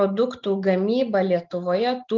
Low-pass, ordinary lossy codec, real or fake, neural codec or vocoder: 7.2 kHz; Opus, 32 kbps; real; none